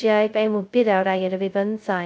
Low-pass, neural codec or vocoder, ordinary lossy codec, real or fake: none; codec, 16 kHz, 0.2 kbps, FocalCodec; none; fake